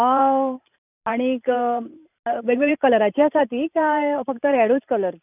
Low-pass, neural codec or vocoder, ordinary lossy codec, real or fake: 3.6 kHz; vocoder, 44.1 kHz, 128 mel bands every 512 samples, BigVGAN v2; none; fake